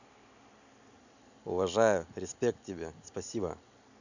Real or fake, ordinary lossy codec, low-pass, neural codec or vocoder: real; none; 7.2 kHz; none